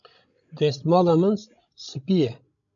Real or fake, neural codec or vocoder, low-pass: fake; codec, 16 kHz, 16 kbps, FreqCodec, larger model; 7.2 kHz